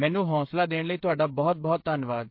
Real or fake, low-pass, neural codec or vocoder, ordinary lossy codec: fake; 5.4 kHz; codec, 16 kHz, 8 kbps, FreqCodec, smaller model; MP3, 32 kbps